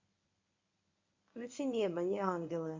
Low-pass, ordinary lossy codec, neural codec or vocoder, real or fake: 7.2 kHz; MP3, 64 kbps; codec, 24 kHz, 0.9 kbps, WavTokenizer, medium speech release version 1; fake